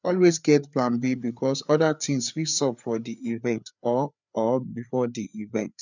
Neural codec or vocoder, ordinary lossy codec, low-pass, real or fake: codec, 16 kHz, 4 kbps, FreqCodec, larger model; none; 7.2 kHz; fake